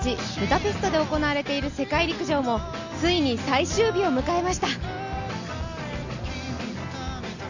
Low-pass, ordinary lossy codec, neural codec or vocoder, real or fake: 7.2 kHz; none; none; real